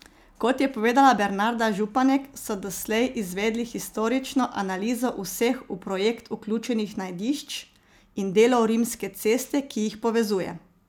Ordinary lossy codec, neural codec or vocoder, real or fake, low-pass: none; none; real; none